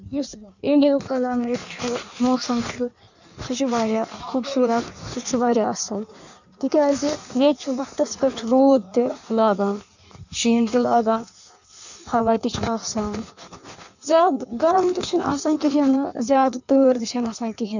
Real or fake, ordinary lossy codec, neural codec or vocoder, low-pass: fake; none; codec, 16 kHz in and 24 kHz out, 1.1 kbps, FireRedTTS-2 codec; 7.2 kHz